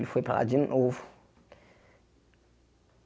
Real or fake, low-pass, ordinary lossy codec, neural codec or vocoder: real; none; none; none